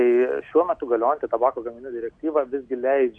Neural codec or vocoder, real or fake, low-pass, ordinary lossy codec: none; real; 9.9 kHz; AAC, 64 kbps